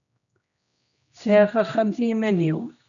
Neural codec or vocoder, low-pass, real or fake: codec, 16 kHz, 1 kbps, X-Codec, HuBERT features, trained on general audio; 7.2 kHz; fake